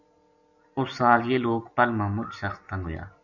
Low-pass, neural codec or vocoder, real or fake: 7.2 kHz; none; real